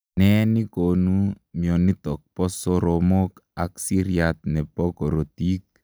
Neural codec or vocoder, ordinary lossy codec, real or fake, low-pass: none; none; real; none